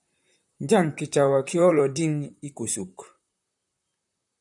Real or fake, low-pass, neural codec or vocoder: fake; 10.8 kHz; vocoder, 44.1 kHz, 128 mel bands, Pupu-Vocoder